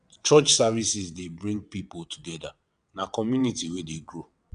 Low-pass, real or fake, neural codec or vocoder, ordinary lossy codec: 9.9 kHz; fake; vocoder, 22.05 kHz, 80 mel bands, WaveNeXt; none